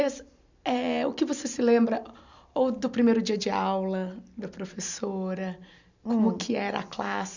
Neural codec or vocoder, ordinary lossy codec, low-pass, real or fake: none; none; 7.2 kHz; real